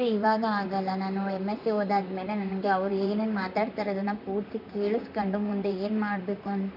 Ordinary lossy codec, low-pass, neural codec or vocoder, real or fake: none; 5.4 kHz; vocoder, 44.1 kHz, 128 mel bands, Pupu-Vocoder; fake